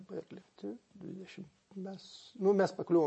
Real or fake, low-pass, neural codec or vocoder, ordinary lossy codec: real; 9.9 kHz; none; MP3, 32 kbps